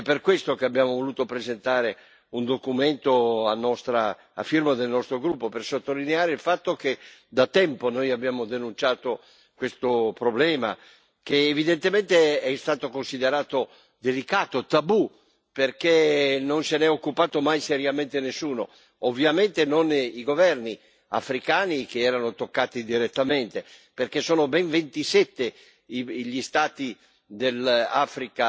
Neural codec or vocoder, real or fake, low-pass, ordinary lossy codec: none; real; none; none